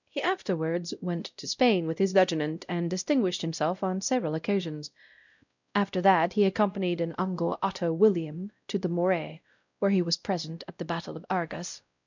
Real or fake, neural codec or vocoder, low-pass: fake; codec, 16 kHz, 0.5 kbps, X-Codec, WavLM features, trained on Multilingual LibriSpeech; 7.2 kHz